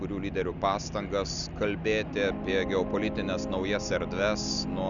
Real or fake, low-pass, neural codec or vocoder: real; 7.2 kHz; none